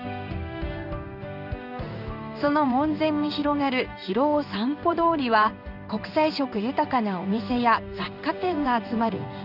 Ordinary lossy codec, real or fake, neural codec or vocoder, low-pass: none; fake; codec, 16 kHz in and 24 kHz out, 1 kbps, XY-Tokenizer; 5.4 kHz